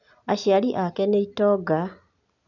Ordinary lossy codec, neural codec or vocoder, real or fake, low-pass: none; none; real; 7.2 kHz